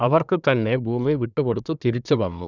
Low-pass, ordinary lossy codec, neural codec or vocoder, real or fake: 7.2 kHz; none; codec, 24 kHz, 1 kbps, SNAC; fake